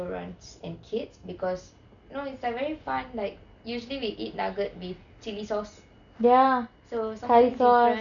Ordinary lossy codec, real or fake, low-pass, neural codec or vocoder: none; real; 7.2 kHz; none